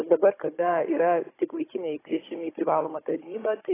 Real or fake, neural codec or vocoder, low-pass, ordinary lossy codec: fake; codec, 16 kHz, 16 kbps, FunCodec, trained on Chinese and English, 50 frames a second; 3.6 kHz; AAC, 16 kbps